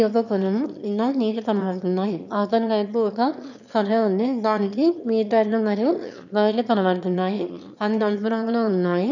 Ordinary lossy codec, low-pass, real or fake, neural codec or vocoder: none; 7.2 kHz; fake; autoencoder, 22.05 kHz, a latent of 192 numbers a frame, VITS, trained on one speaker